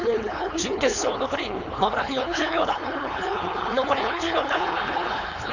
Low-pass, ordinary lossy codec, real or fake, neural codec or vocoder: 7.2 kHz; none; fake; codec, 16 kHz, 4.8 kbps, FACodec